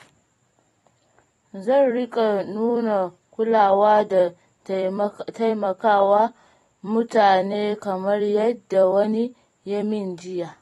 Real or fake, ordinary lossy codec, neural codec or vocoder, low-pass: fake; AAC, 32 kbps; vocoder, 44.1 kHz, 128 mel bands every 256 samples, BigVGAN v2; 19.8 kHz